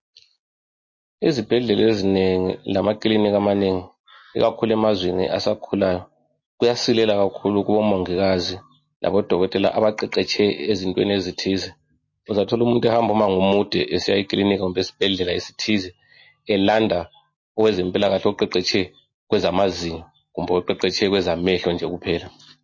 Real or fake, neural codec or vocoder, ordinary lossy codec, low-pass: real; none; MP3, 32 kbps; 7.2 kHz